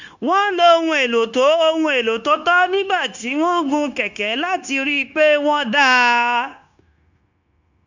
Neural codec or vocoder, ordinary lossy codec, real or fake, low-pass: codec, 16 kHz, 0.9 kbps, LongCat-Audio-Codec; none; fake; 7.2 kHz